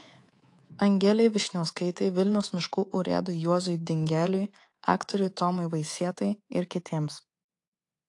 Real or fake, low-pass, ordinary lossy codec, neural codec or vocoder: fake; 10.8 kHz; AAC, 48 kbps; codec, 24 kHz, 3.1 kbps, DualCodec